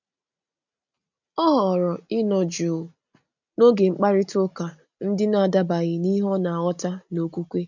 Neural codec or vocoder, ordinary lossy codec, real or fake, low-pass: none; none; real; 7.2 kHz